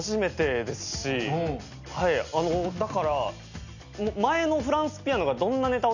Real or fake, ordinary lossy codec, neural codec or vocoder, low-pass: real; none; none; 7.2 kHz